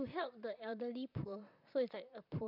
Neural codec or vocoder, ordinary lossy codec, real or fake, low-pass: vocoder, 44.1 kHz, 128 mel bands, Pupu-Vocoder; none; fake; 5.4 kHz